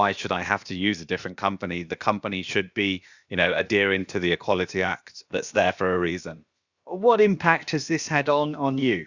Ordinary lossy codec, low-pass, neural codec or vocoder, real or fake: Opus, 64 kbps; 7.2 kHz; codec, 16 kHz, about 1 kbps, DyCAST, with the encoder's durations; fake